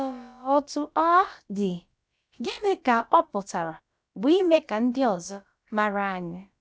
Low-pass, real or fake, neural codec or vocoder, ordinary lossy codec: none; fake; codec, 16 kHz, about 1 kbps, DyCAST, with the encoder's durations; none